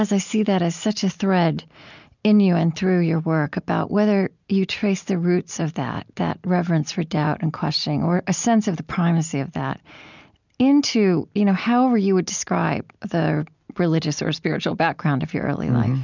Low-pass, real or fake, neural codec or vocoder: 7.2 kHz; real; none